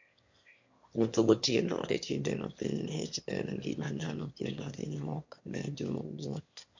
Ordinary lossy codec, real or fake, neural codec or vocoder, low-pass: MP3, 48 kbps; fake; autoencoder, 22.05 kHz, a latent of 192 numbers a frame, VITS, trained on one speaker; 7.2 kHz